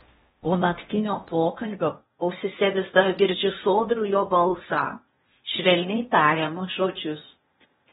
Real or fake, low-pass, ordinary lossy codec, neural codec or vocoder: fake; 10.8 kHz; AAC, 16 kbps; codec, 16 kHz in and 24 kHz out, 0.8 kbps, FocalCodec, streaming, 65536 codes